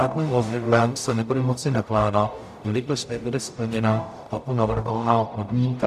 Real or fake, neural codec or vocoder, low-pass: fake; codec, 44.1 kHz, 0.9 kbps, DAC; 14.4 kHz